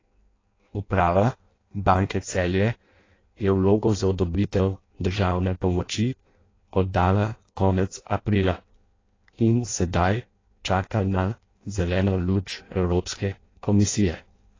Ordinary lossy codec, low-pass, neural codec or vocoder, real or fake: AAC, 32 kbps; 7.2 kHz; codec, 16 kHz in and 24 kHz out, 0.6 kbps, FireRedTTS-2 codec; fake